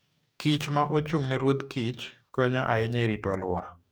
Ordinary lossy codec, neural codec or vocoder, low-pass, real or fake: none; codec, 44.1 kHz, 2.6 kbps, DAC; none; fake